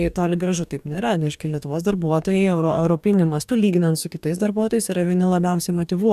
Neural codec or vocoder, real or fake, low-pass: codec, 44.1 kHz, 2.6 kbps, DAC; fake; 14.4 kHz